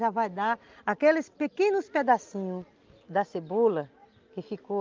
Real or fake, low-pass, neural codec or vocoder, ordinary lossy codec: real; 7.2 kHz; none; Opus, 24 kbps